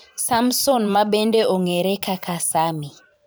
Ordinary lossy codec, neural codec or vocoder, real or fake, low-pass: none; vocoder, 44.1 kHz, 128 mel bands every 512 samples, BigVGAN v2; fake; none